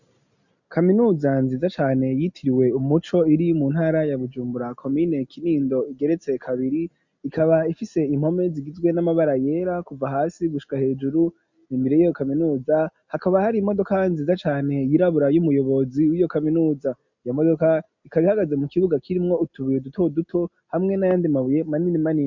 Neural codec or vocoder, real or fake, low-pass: none; real; 7.2 kHz